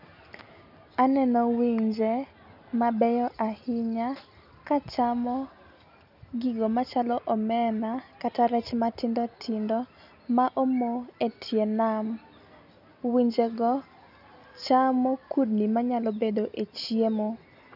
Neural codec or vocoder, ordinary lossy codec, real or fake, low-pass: none; none; real; 5.4 kHz